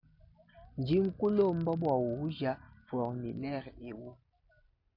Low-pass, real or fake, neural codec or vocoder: 5.4 kHz; real; none